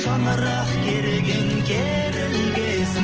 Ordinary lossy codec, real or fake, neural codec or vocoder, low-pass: Opus, 16 kbps; real; none; 7.2 kHz